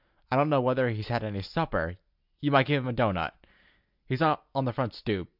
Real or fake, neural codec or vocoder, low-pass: real; none; 5.4 kHz